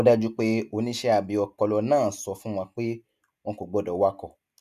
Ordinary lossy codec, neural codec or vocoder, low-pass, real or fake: none; none; 14.4 kHz; real